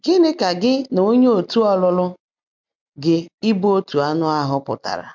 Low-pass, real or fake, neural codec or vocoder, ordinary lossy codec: 7.2 kHz; real; none; MP3, 64 kbps